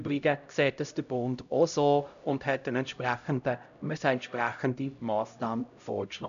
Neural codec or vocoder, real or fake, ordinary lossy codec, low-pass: codec, 16 kHz, 0.5 kbps, X-Codec, HuBERT features, trained on LibriSpeech; fake; none; 7.2 kHz